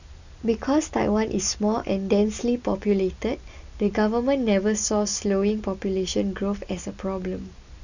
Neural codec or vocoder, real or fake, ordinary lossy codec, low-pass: none; real; none; 7.2 kHz